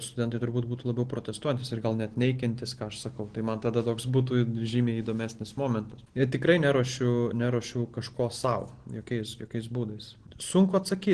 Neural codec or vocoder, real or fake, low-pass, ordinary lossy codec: none; real; 9.9 kHz; Opus, 16 kbps